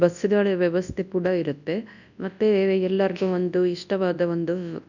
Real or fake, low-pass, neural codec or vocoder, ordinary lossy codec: fake; 7.2 kHz; codec, 24 kHz, 0.9 kbps, WavTokenizer, large speech release; none